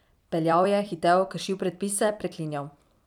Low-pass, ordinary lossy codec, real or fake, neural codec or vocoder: 19.8 kHz; none; fake; vocoder, 44.1 kHz, 128 mel bands every 256 samples, BigVGAN v2